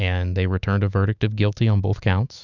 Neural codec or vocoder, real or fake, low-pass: autoencoder, 48 kHz, 128 numbers a frame, DAC-VAE, trained on Japanese speech; fake; 7.2 kHz